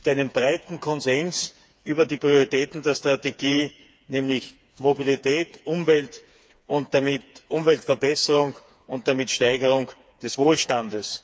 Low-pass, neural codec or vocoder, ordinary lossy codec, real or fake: none; codec, 16 kHz, 4 kbps, FreqCodec, smaller model; none; fake